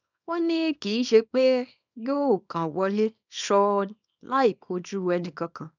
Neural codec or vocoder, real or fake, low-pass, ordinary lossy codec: codec, 24 kHz, 0.9 kbps, WavTokenizer, small release; fake; 7.2 kHz; none